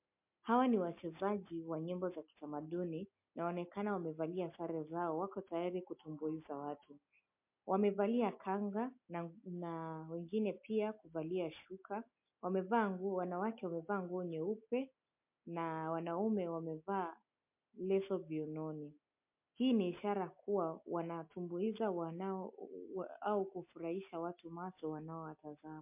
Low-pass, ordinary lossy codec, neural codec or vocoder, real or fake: 3.6 kHz; MP3, 32 kbps; none; real